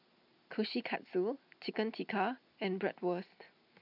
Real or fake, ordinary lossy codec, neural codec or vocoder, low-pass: real; none; none; 5.4 kHz